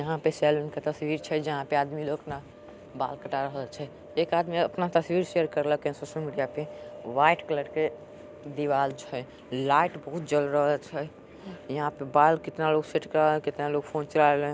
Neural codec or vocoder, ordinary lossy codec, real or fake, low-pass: none; none; real; none